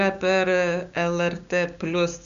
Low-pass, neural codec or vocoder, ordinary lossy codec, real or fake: 7.2 kHz; codec, 16 kHz, 6 kbps, DAC; Opus, 64 kbps; fake